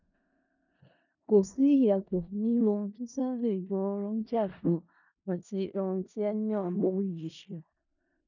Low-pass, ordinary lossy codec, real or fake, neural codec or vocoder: 7.2 kHz; none; fake; codec, 16 kHz in and 24 kHz out, 0.4 kbps, LongCat-Audio-Codec, four codebook decoder